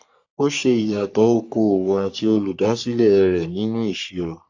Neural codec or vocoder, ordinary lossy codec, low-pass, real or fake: codec, 44.1 kHz, 3.4 kbps, Pupu-Codec; AAC, 48 kbps; 7.2 kHz; fake